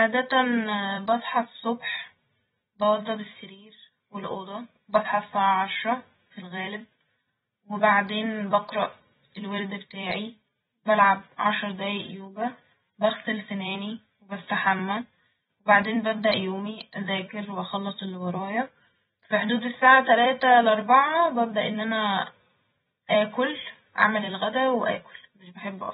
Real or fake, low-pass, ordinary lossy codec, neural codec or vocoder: fake; 19.8 kHz; AAC, 16 kbps; vocoder, 44.1 kHz, 128 mel bands every 256 samples, BigVGAN v2